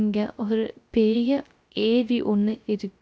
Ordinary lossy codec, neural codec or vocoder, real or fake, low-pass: none; codec, 16 kHz, 0.3 kbps, FocalCodec; fake; none